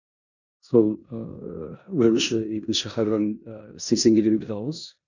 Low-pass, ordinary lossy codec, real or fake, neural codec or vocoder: 7.2 kHz; none; fake; codec, 16 kHz in and 24 kHz out, 0.9 kbps, LongCat-Audio-Codec, four codebook decoder